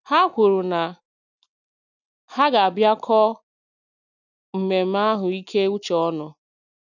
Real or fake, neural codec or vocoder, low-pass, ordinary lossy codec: real; none; 7.2 kHz; none